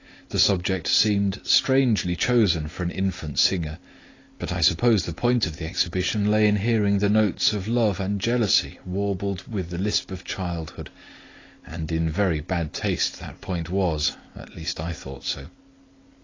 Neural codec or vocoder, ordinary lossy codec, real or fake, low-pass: none; AAC, 32 kbps; real; 7.2 kHz